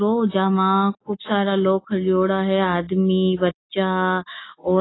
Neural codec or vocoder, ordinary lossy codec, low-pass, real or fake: none; AAC, 16 kbps; 7.2 kHz; real